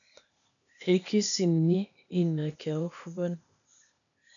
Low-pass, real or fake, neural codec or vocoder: 7.2 kHz; fake; codec, 16 kHz, 0.8 kbps, ZipCodec